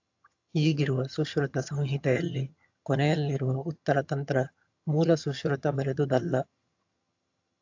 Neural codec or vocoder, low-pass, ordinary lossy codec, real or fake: vocoder, 22.05 kHz, 80 mel bands, HiFi-GAN; 7.2 kHz; AAC, 48 kbps; fake